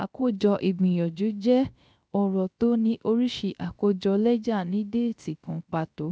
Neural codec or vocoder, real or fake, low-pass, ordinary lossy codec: codec, 16 kHz, 0.3 kbps, FocalCodec; fake; none; none